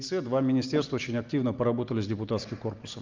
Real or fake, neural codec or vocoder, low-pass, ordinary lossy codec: real; none; 7.2 kHz; Opus, 24 kbps